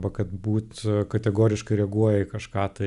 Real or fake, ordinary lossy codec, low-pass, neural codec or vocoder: real; Opus, 64 kbps; 10.8 kHz; none